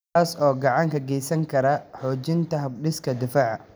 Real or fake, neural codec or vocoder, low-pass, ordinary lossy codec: real; none; none; none